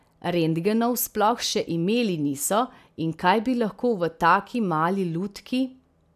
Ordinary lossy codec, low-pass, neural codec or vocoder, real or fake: none; 14.4 kHz; none; real